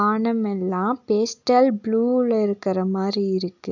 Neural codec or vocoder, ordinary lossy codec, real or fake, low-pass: none; none; real; 7.2 kHz